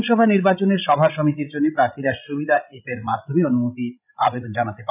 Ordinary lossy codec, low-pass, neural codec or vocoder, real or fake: none; 3.6 kHz; codec, 16 kHz, 16 kbps, FreqCodec, larger model; fake